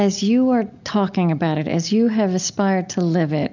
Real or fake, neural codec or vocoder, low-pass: real; none; 7.2 kHz